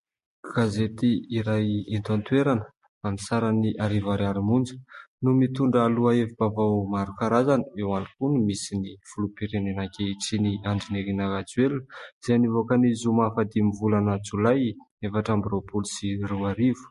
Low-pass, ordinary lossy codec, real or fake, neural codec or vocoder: 14.4 kHz; MP3, 48 kbps; real; none